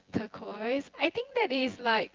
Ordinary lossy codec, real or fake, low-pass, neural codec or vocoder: Opus, 32 kbps; fake; 7.2 kHz; vocoder, 24 kHz, 100 mel bands, Vocos